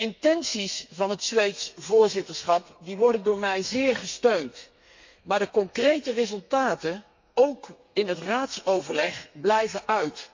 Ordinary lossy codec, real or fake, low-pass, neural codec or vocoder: MP3, 64 kbps; fake; 7.2 kHz; codec, 32 kHz, 1.9 kbps, SNAC